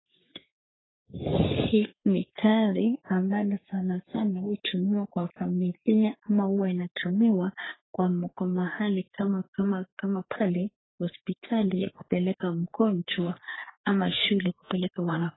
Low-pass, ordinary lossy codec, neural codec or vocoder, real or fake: 7.2 kHz; AAC, 16 kbps; codec, 44.1 kHz, 3.4 kbps, Pupu-Codec; fake